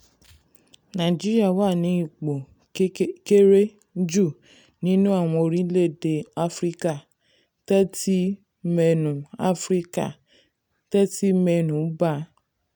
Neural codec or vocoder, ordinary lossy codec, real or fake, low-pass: none; none; real; none